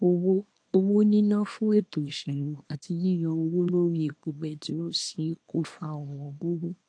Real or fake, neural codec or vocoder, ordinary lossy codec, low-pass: fake; codec, 24 kHz, 0.9 kbps, WavTokenizer, small release; AAC, 48 kbps; 9.9 kHz